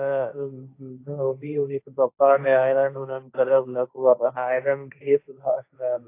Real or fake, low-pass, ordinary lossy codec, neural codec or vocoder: fake; 3.6 kHz; AAC, 24 kbps; codec, 16 kHz, 1.1 kbps, Voila-Tokenizer